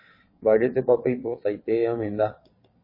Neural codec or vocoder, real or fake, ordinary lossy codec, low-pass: vocoder, 22.05 kHz, 80 mel bands, WaveNeXt; fake; MP3, 24 kbps; 5.4 kHz